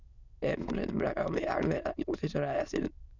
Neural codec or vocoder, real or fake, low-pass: autoencoder, 22.05 kHz, a latent of 192 numbers a frame, VITS, trained on many speakers; fake; 7.2 kHz